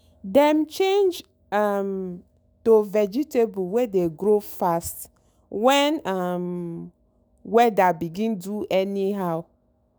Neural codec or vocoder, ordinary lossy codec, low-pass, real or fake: autoencoder, 48 kHz, 128 numbers a frame, DAC-VAE, trained on Japanese speech; none; none; fake